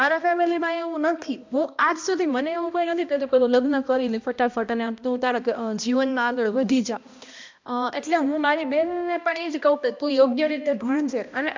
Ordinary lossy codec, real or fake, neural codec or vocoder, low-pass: MP3, 64 kbps; fake; codec, 16 kHz, 1 kbps, X-Codec, HuBERT features, trained on balanced general audio; 7.2 kHz